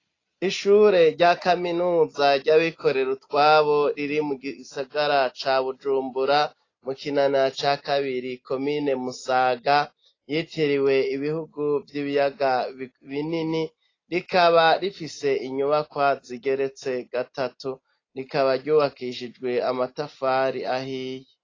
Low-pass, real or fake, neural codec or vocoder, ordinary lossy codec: 7.2 kHz; real; none; AAC, 32 kbps